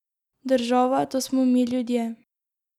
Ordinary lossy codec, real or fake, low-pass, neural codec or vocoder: none; real; 19.8 kHz; none